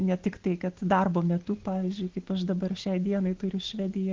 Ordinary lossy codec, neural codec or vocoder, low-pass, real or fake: Opus, 16 kbps; none; 7.2 kHz; real